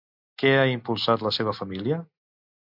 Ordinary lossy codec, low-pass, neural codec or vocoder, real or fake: MP3, 48 kbps; 5.4 kHz; none; real